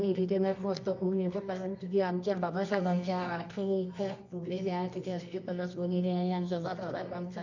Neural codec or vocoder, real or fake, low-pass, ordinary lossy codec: codec, 24 kHz, 0.9 kbps, WavTokenizer, medium music audio release; fake; 7.2 kHz; none